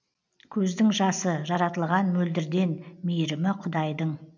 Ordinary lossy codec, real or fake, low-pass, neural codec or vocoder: none; real; 7.2 kHz; none